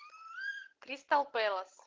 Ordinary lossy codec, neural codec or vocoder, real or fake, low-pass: Opus, 16 kbps; none; real; 7.2 kHz